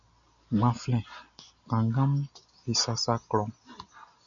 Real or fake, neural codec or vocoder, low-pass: real; none; 7.2 kHz